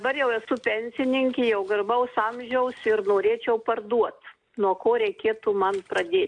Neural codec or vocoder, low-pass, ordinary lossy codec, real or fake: none; 9.9 kHz; AAC, 64 kbps; real